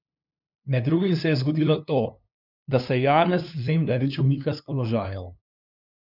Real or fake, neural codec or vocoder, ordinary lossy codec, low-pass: fake; codec, 16 kHz, 2 kbps, FunCodec, trained on LibriTTS, 25 frames a second; none; 5.4 kHz